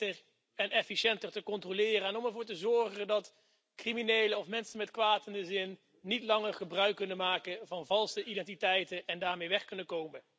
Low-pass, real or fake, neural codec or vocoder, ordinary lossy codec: none; real; none; none